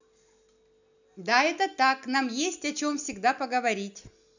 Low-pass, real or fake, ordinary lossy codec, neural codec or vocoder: 7.2 kHz; real; none; none